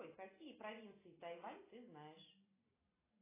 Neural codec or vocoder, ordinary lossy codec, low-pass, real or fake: none; AAC, 16 kbps; 3.6 kHz; real